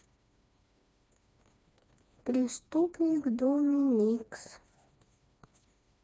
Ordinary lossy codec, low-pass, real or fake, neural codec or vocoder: none; none; fake; codec, 16 kHz, 2 kbps, FreqCodec, smaller model